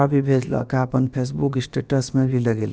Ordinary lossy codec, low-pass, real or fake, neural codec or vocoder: none; none; fake; codec, 16 kHz, about 1 kbps, DyCAST, with the encoder's durations